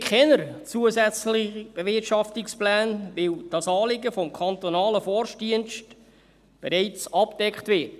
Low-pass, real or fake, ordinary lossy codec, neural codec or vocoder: 14.4 kHz; real; none; none